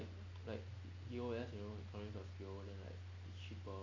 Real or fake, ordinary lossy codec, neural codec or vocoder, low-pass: real; MP3, 48 kbps; none; 7.2 kHz